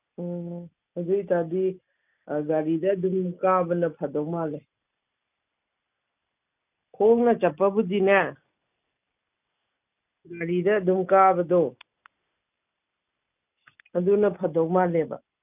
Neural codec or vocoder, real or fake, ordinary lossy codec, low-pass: none; real; none; 3.6 kHz